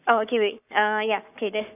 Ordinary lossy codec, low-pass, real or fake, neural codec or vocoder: none; 3.6 kHz; fake; codec, 44.1 kHz, 7.8 kbps, Pupu-Codec